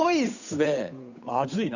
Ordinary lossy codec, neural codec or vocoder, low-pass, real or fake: none; codec, 16 kHz, 8 kbps, FunCodec, trained on Chinese and English, 25 frames a second; 7.2 kHz; fake